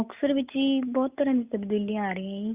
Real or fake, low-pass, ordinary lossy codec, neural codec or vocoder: real; 3.6 kHz; Opus, 64 kbps; none